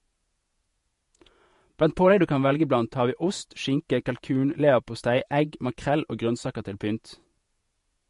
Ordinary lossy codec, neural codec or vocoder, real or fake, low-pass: MP3, 48 kbps; vocoder, 48 kHz, 128 mel bands, Vocos; fake; 14.4 kHz